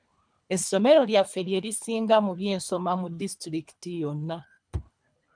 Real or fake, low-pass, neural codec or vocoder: fake; 9.9 kHz; codec, 24 kHz, 3 kbps, HILCodec